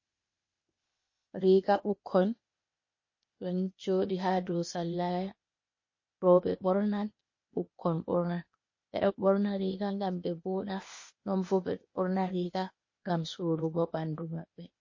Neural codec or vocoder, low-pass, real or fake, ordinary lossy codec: codec, 16 kHz, 0.8 kbps, ZipCodec; 7.2 kHz; fake; MP3, 32 kbps